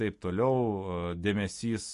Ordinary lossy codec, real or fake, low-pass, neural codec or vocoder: MP3, 48 kbps; real; 10.8 kHz; none